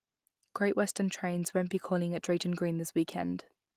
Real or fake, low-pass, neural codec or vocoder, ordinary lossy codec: real; 14.4 kHz; none; Opus, 24 kbps